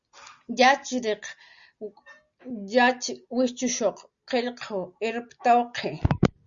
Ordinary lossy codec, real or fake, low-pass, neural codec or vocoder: Opus, 64 kbps; real; 7.2 kHz; none